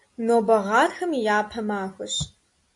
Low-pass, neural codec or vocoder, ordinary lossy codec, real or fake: 10.8 kHz; none; AAC, 48 kbps; real